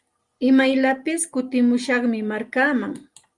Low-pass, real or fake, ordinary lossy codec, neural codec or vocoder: 10.8 kHz; real; Opus, 32 kbps; none